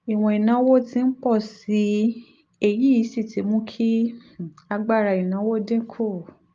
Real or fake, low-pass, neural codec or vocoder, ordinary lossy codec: real; 7.2 kHz; none; Opus, 32 kbps